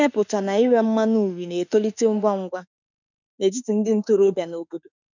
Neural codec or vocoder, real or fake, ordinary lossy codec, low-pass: autoencoder, 48 kHz, 32 numbers a frame, DAC-VAE, trained on Japanese speech; fake; none; 7.2 kHz